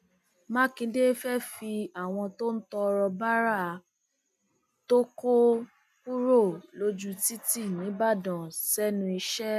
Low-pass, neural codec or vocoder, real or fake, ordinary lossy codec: 14.4 kHz; none; real; none